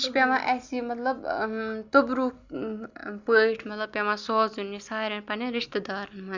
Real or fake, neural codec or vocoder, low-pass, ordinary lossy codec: real; none; 7.2 kHz; Opus, 64 kbps